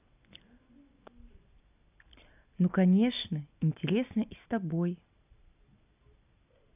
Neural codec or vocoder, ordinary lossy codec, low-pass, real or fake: none; none; 3.6 kHz; real